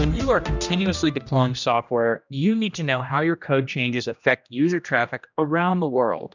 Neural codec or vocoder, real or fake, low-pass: codec, 16 kHz, 1 kbps, X-Codec, HuBERT features, trained on general audio; fake; 7.2 kHz